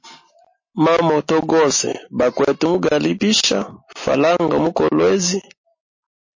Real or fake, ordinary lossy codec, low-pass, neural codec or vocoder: real; MP3, 32 kbps; 7.2 kHz; none